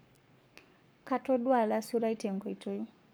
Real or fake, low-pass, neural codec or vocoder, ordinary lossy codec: fake; none; codec, 44.1 kHz, 7.8 kbps, Pupu-Codec; none